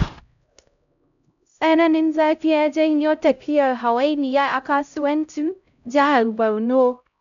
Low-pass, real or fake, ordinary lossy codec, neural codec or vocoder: 7.2 kHz; fake; none; codec, 16 kHz, 0.5 kbps, X-Codec, HuBERT features, trained on LibriSpeech